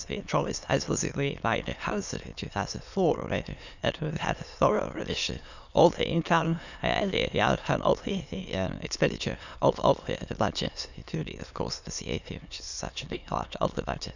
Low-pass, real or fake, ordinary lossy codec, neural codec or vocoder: 7.2 kHz; fake; none; autoencoder, 22.05 kHz, a latent of 192 numbers a frame, VITS, trained on many speakers